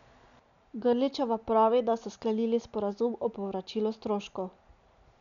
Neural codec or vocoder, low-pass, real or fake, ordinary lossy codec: none; 7.2 kHz; real; none